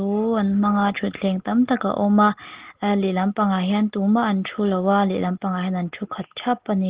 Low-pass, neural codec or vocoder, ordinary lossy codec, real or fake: 3.6 kHz; none; Opus, 16 kbps; real